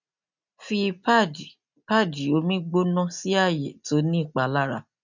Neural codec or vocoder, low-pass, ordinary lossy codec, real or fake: none; 7.2 kHz; none; real